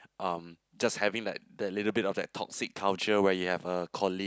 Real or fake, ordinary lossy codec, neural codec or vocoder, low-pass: real; none; none; none